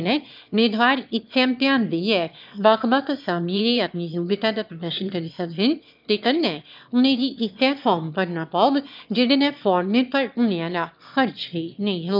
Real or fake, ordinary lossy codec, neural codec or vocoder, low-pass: fake; none; autoencoder, 22.05 kHz, a latent of 192 numbers a frame, VITS, trained on one speaker; 5.4 kHz